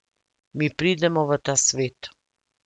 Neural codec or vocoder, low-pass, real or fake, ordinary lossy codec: none; 10.8 kHz; real; none